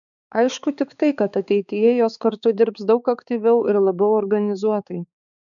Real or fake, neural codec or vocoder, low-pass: fake; codec, 16 kHz, 4 kbps, X-Codec, HuBERT features, trained on balanced general audio; 7.2 kHz